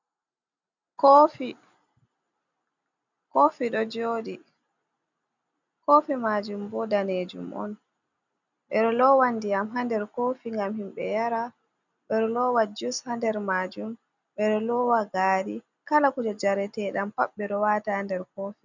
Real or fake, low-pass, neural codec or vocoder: real; 7.2 kHz; none